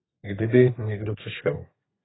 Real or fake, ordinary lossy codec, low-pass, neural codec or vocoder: fake; AAC, 16 kbps; 7.2 kHz; codec, 32 kHz, 1.9 kbps, SNAC